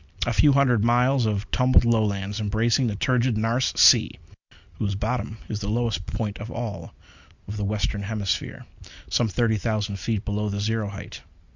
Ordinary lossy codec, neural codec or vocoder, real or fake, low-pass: Opus, 64 kbps; none; real; 7.2 kHz